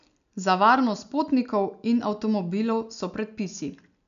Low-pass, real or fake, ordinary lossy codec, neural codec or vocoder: 7.2 kHz; real; none; none